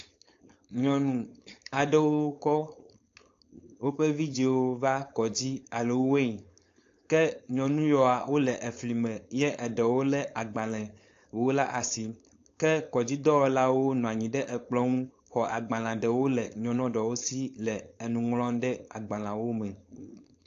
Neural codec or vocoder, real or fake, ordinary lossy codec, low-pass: codec, 16 kHz, 4.8 kbps, FACodec; fake; AAC, 48 kbps; 7.2 kHz